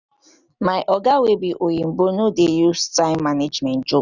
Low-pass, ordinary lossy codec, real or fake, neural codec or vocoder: 7.2 kHz; none; real; none